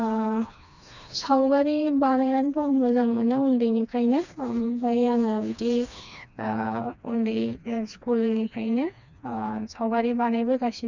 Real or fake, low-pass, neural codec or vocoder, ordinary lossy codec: fake; 7.2 kHz; codec, 16 kHz, 2 kbps, FreqCodec, smaller model; none